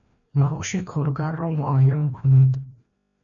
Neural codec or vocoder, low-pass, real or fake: codec, 16 kHz, 1 kbps, FreqCodec, larger model; 7.2 kHz; fake